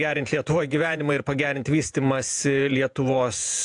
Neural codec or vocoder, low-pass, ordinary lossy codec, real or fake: vocoder, 48 kHz, 128 mel bands, Vocos; 10.8 kHz; AAC, 64 kbps; fake